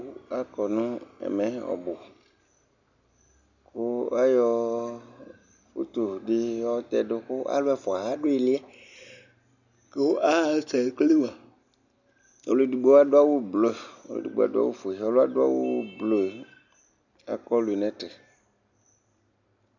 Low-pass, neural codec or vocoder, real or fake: 7.2 kHz; none; real